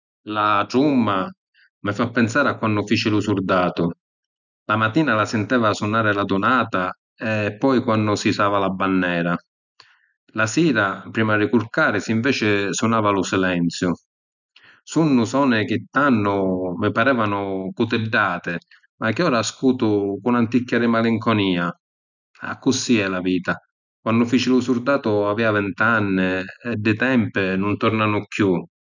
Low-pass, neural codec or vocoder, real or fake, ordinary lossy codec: 7.2 kHz; none; real; none